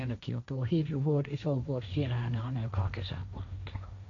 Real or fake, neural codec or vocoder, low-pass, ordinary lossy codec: fake; codec, 16 kHz, 1.1 kbps, Voila-Tokenizer; 7.2 kHz; none